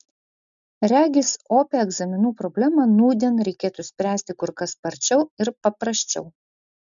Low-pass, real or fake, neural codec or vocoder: 7.2 kHz; real; none